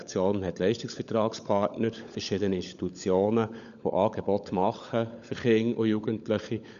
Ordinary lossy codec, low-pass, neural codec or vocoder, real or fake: none; 7.2 kHz; codec, 16 kHz, 16 kbps, FunCodec, trained on LibriTTS, 50 frames a second; fake